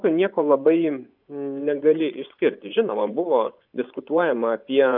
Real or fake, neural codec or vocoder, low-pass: fake; vocoder, 24 kHz, 100 mel bands, Vocos; 5.4 kHz